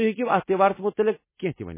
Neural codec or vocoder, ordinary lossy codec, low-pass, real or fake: none; MP3, 16 kbps; 3.6 kHz; real